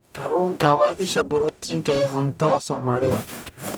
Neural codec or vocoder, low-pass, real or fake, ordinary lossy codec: codec, 44.1 kHz, 0.9 kbps, DAC; none; fake; none